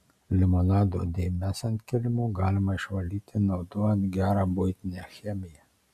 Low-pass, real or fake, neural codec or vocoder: 14.4 kHz; real; none